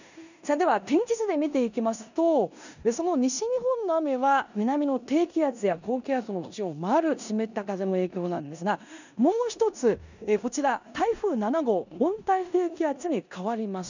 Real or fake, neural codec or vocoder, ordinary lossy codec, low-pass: fake; codec, 16 kHz in and 24 kHz out, 0.9 kbps, LongCat-Audio-Codec, four codebook decoder; none; 7.2 kHz